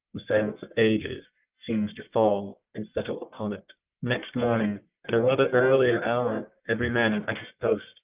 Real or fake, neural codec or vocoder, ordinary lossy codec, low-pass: fake; codec, 44.1 kHz, 1.7 kbps, Pupu-Codec; Opus, 32 kbps; 3.6 kHz